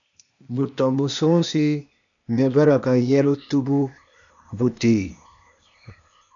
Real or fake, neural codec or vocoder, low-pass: fake; codec, 16 kHz, 0.8 kbps, ZipCodec; 7.2 kHz